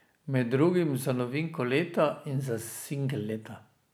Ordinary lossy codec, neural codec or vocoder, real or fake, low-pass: none; none; real; none